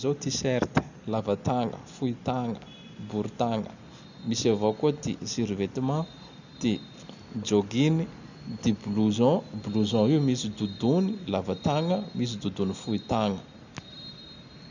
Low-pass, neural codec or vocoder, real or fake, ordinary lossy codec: 7.2 kHz; none; real; none